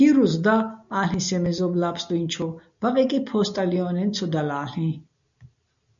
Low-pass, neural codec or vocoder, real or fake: 7.2 kHz; none; real